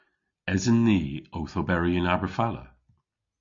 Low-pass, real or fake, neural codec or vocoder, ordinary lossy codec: 7.2 kHz; real; none; MP3, 48 kbps